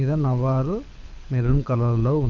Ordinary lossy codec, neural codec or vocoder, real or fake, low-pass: MP3, 48 kbps; codec, 24 kHz, 6 kbps, HILCodec; fake; 7.2 kHz